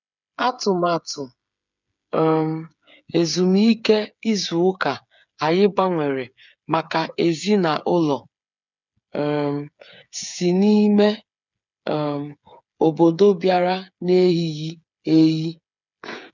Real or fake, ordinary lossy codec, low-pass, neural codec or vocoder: fake; none; 7.2 kHz; codec, 16 kHz, 8 kbps, FreqCodec, smaller model